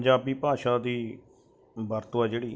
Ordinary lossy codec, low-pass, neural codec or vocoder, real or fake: none; none; none; real